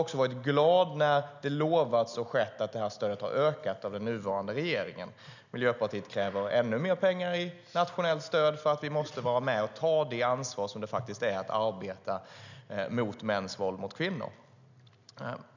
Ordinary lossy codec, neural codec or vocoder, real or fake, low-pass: none; none; real; 7.2 kHz